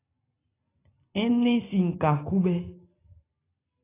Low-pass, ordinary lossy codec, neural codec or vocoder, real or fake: 3.6 kHz; AAC, 16 kbps; none; real